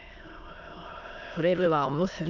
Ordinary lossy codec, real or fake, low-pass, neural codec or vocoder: none; fake; 7.2 kHz; autoencoder, 22.05 kHz, a latent of 192 numbers a frame, VITS, trained on many speakers